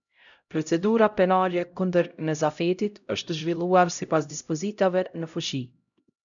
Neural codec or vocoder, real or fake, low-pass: codec, 16 kHz, 0.5 kbps, X-Codec, HuBERT features, trained on LibriSpeech; fake; 7.2 kHz